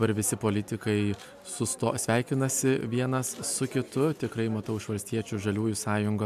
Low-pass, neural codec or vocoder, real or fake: 14.4 kHz; none; real